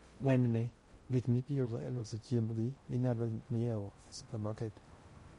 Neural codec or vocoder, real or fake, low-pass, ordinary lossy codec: codec, 16 kHz in and 24 kHz out, 0.6 kbps, FocalCodec, streaming, 2048 codes; fake; 10.8 kHz; MP3, 48 kbps